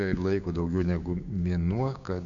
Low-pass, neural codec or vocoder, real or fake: 7.2 kHz; codec, 16 kHz, 6 kbps, DAC; fake